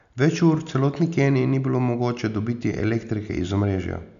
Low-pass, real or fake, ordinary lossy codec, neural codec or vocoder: 7.2 kHz; real; none; none